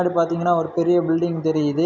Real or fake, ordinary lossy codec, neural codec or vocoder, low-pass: real; none; none; 7.2 kHz